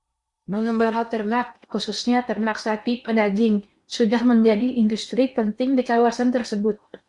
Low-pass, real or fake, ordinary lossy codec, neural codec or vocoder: 10.8 kHz; fake; Opus, 64 kbps; codec, 16 kHz in and 24 kHz out, 0.8 kbps, FocalCodec, streaming, 65536 codes